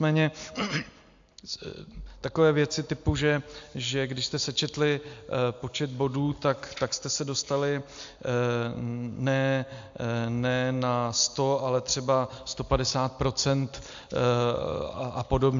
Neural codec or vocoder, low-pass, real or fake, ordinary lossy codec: none; 7.2 kHz; real; AAC, 64 kbps